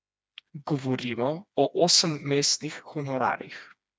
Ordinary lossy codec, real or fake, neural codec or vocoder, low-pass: none; fake; codec, 16 kHz, 2 kbps, FreqCodec, smaller model; none